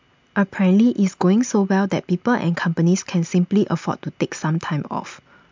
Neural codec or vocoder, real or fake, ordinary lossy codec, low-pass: none; real; MP3, 64 kbps; 7.2 kHz